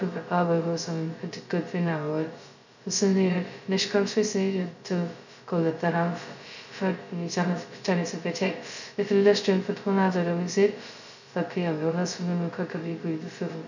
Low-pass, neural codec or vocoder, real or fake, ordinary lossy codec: 7.2 kHz; codec, 16 kHz, 0.2 kbps, FocalCodec; fake; none